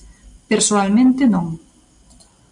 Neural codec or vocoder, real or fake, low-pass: none; real; 10.8 kHz